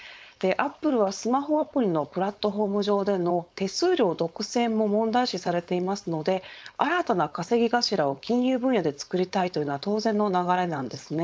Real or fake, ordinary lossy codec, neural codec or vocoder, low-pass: fake; none; codec, 16 kHz, 4.8 kbps, FACodec; none